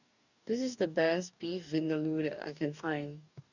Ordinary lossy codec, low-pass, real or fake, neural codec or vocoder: none; 7.2 kHz; fake; codec, 44.1 kHz, 2.6 kbps, DAC